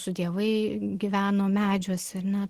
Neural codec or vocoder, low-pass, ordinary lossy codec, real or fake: none; 14.4 kHz; Opus, 16 kbps; real